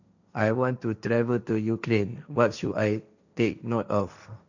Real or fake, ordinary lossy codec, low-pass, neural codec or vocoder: fake; none; 7.2 kHz; codec, 16 kHz, 1.1 kbps, Voila-Tokenizer